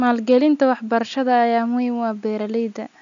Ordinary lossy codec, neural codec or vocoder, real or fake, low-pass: none; none; real; 7.2 kHz